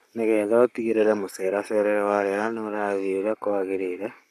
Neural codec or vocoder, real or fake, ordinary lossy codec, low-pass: codec, 44.1 kHz, 7.8 kbps, Pupu-Codec; fake; none; 14.4 kHz